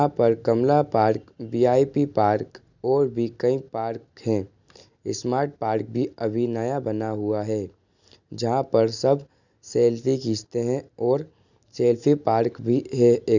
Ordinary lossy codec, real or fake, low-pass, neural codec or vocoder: none; real; 7.2 kHz; none